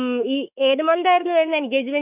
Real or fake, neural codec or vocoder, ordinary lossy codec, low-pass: fake; autoencoder, 48 kHz, 32 numbers a frame, DAC-VAE, trained on Japanese speech; none; 3.6 kHz